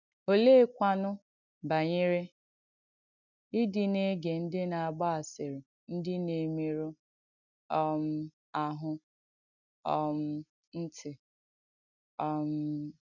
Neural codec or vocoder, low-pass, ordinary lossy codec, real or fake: none; 7.2 kHz; none; real